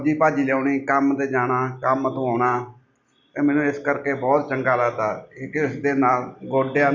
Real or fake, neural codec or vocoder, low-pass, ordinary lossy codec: real; none; 7.2 kHz; none